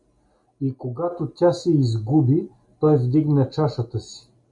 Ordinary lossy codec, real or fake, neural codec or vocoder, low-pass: MP3, 48 kbps; real; none; 10.8 kHz